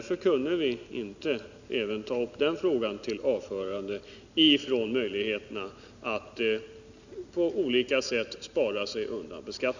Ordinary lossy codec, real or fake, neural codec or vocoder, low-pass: none; real; none; 7.2 kHz